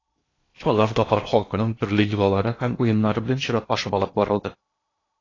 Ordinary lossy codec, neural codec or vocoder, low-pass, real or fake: AAC, 32 kbps; codec, 16 kHz in and 24 kHz out, 0.8 kbps, FocalCodec, streaming, 65536 codes; 7.2 kHz; fake